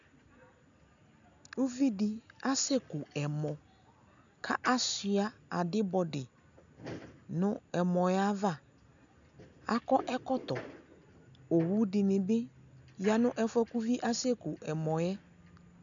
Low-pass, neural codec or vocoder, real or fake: 7.2 kHz; none; real